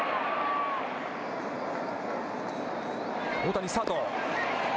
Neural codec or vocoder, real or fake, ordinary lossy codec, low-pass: none; real; none; none